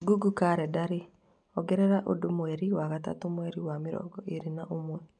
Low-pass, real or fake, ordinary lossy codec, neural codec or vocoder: 9.9 kHz; real; none; none